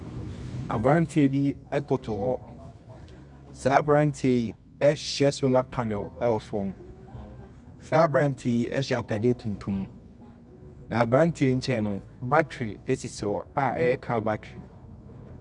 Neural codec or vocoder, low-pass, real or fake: codec, 24 kHz, 0.9 kbps, WavTokenizer, medium music audio release; 10.8 kHz; fake